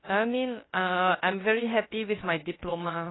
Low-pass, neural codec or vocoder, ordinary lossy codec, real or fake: 7.2 kHz; codec, 16 kHz, about 1 kbps, DyCAST, with the encoder's durations; AAC, 16 kbps; fake